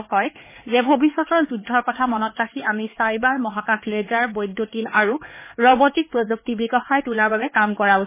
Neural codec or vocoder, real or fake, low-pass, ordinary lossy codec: codec, 16 kHz, 4 kbps, X-Codec, HuBERT features, trained on LibriSpeech; fake; 3.6 kHz; MP3, 16 kbps